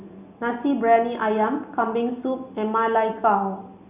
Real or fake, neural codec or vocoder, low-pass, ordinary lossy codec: real; none; 3.6 kHz; Opus, 64 kbps